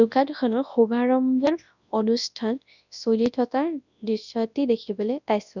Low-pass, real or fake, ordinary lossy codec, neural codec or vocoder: 7.2 kHz; fake; none; codec, 24 kHz, 0.9 kbps, WavTokenizer, large speech release